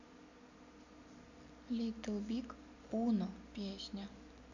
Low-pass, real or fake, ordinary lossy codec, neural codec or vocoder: 7.2 kHz; real; none; none